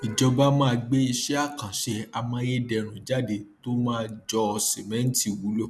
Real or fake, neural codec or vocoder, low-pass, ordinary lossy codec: real; none; none; none